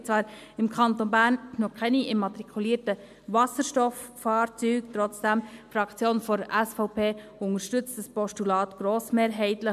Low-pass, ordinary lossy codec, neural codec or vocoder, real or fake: 14.4 kHz; MP3, 96 kbps; none; real